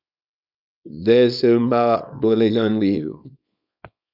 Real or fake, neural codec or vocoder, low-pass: fake; codec, 24 kHz, 0.9 kbps, WavTokenizer, small release; 5.4 kHz